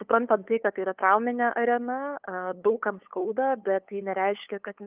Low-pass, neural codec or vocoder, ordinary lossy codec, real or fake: 3.6 kHz; codec, 16 kHz, 8 kbps, FunCodec, trained on LibriTTS, 25 frames a second; Opus, 24 kbps; fake